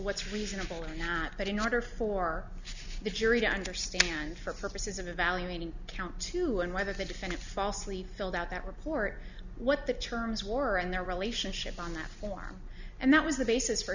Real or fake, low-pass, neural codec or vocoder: real; 7.2 kHz; none